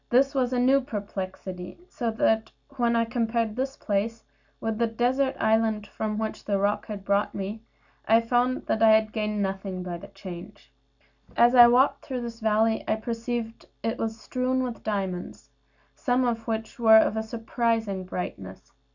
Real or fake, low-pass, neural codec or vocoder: real; 7.2 kHz; none